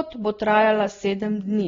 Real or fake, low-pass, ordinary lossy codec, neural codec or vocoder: real; 7.2 kHz; AAC, 24 kbps; none